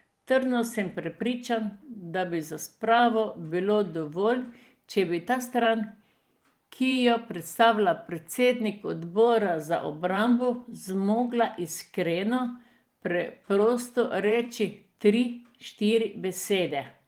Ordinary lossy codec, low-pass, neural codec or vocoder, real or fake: Opus, 24 kbps; 19.8 kHz; vocoder, 44.1 kHz, 128 mel bands every 512 samples, BigVGAN v2; fake